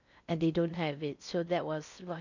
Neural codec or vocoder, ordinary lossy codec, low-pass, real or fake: codec, 16 kHz in and 24 kHz out, 0.6 kbps, FocalCodec, streaming, 4096 codes; none; 7.2 kHz; fake